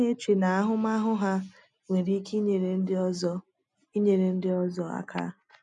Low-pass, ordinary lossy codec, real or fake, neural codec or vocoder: 10.8 kHz; none; real; none